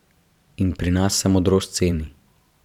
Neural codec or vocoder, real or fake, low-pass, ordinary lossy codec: vocoder, 44.1 kHz, 128 mel bands every 512 samples, BigVGAN v2; fake; 19.8 kHz; none